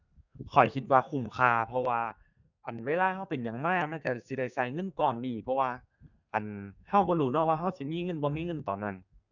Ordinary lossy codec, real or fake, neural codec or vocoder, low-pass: none; fake; codec, 32 kHz, 1.9 kbps, SNAC; 7.2 kHz